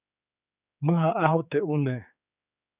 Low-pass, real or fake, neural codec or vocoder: 3.6 kHz; fake; codec, 16 kHz, 4 kbps, X-Codec, HuBERT features, trained on general audio